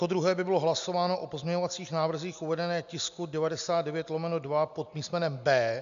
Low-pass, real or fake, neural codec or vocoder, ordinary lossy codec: 7.2 kHz; real; none; MP3, 64 kbps